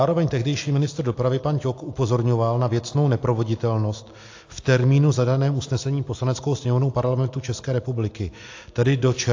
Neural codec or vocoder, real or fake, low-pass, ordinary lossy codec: none; real; 7.2 kHz; AAC, 48 kbps